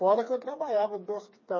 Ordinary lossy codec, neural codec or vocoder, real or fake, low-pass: MP3, 32 kbps; codec, 16 kHz, 4 kbps, FreqCodec, smaller model; fake; 7.2 kHz